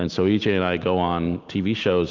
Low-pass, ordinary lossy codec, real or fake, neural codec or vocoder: 7.2 kHz; Opus, 32 kbps; real; none